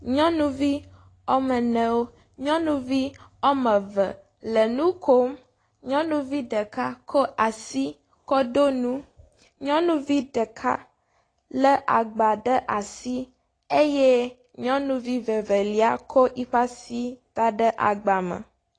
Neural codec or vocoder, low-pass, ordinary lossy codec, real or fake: none; 9.9 kHz; AAC, 32 kbps; real